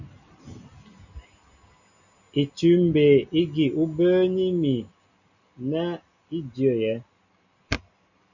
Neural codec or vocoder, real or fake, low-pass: none; real; 7.2 kHz